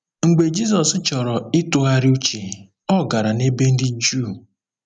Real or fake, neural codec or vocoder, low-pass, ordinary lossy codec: real; none; 9.9 kHz; none